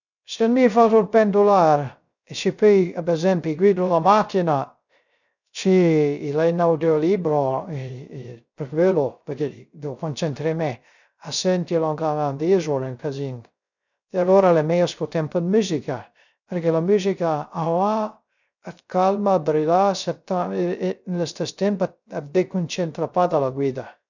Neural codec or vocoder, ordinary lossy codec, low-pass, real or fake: codec, 16 kHz, 0.3 kbps, FocalCodec; none; 7.2 kHz; fake